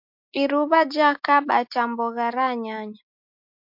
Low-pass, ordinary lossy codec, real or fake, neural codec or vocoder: 5.4 kHz; MP3, 48 kbps; real; none